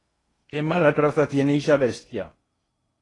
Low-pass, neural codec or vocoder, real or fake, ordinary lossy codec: 10.8 kHz; codec, 16 kHz in and 24 kHz out, 0.8 kbps, FocalCodec, streaming, 65536 codes; fake; AAC, 32 kbps